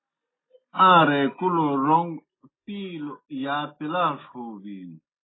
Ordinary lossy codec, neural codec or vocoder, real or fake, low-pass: AAC, 16 kbps; none; real; 7.2 kHz